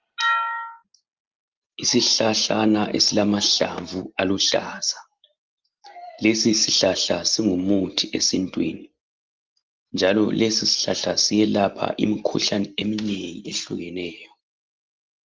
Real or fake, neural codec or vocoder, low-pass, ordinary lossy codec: real; none; 7.2 kHz; Opus, 24 kbps